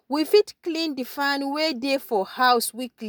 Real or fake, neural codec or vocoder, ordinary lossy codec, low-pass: real; none; none; none